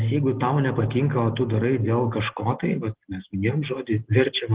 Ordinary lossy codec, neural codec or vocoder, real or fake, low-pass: Opus, 16 kbps; none; real; 3.6 kHz